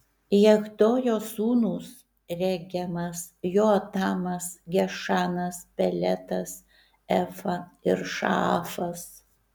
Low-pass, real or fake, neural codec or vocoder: 19.8 kHz; real; none